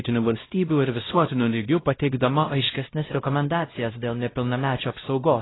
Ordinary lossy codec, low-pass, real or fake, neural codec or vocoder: AAC, 16 kbps; 7.2 kHz; fake; codec, 16 kHz, 0.5 kbps, X-Codec, WavLM features, trained on Multilingual LibriSpeech